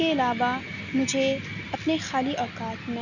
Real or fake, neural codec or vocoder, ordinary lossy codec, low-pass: real; none; none; 7.2 kHz